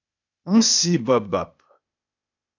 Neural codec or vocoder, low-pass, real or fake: codec, 16 kHz, 0.8 kbps, ZipCodec; 7.2 kHz; fake